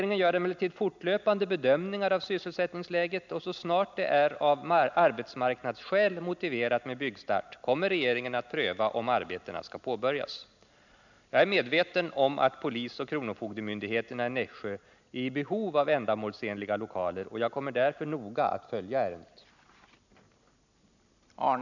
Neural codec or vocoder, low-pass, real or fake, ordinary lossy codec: none; 7.2 kHz; real; none